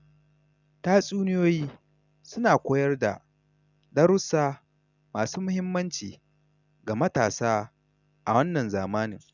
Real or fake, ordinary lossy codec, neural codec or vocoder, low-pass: real; none; none; 7.2 kHz